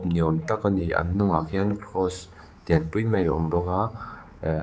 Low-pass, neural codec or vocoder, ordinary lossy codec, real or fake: none; codec, 16 kHz, 4 kbps, X-Codec, HuBERT features, trained on general audio; none; fake